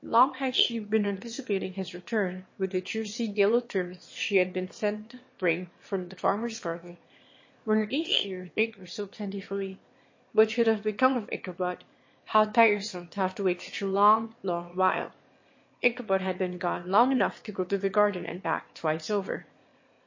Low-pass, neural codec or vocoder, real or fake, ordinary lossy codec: 7.2 kHz; autoencoder, 22.05 kHz, a latent of 192 numbers a frame, VITS, trained on one speaker; fake; MP3, 32 kbps